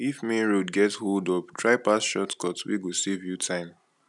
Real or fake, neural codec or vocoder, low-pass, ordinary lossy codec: real; none; 10.8 kHz; none